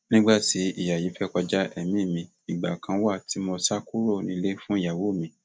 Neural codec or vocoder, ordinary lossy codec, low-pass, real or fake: none; none; none; real